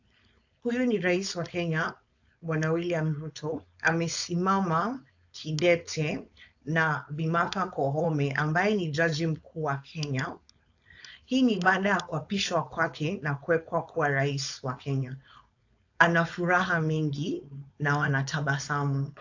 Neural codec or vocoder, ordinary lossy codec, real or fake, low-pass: codec, 16 kHz, 4.8 kbps, FACodec; AAC, 48 kbps; fake; 7.2 kHz